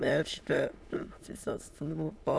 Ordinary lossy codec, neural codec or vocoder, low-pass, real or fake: none; autoencoder, 22.05 kHz, a latent of 192 numbers a frame, VITS, trained on many speakers; none; fake